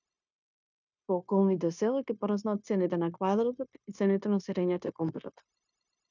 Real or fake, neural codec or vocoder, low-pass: fake; codec, 16 kHz, 0.9 kbps, LongCat-Audio-Codec; 7.2 kHz